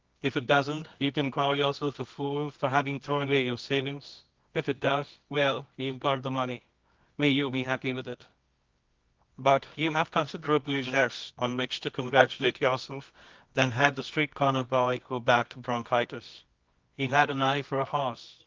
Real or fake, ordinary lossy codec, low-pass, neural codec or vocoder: fake; Opus, 32 kbps; 7.2 kHz; codec, 24 kHz, 0.9 kbps, WavTokenizer, medium music audio release